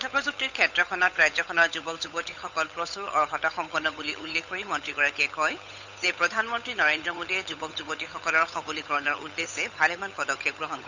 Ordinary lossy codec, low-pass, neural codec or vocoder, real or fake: Opus, 64 kbps; 7.2 kHz; codec, 16 kHz, 8 kbps, FunCodec, trained on Chinese and English, 25 frames a second; fake